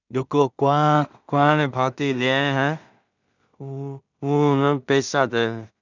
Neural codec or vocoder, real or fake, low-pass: codec, 16 kHz in and 24 kHz out, 0.4 kbps, LongCat-Audio-Codec, two codebook decoder; fake; 7.2 kHz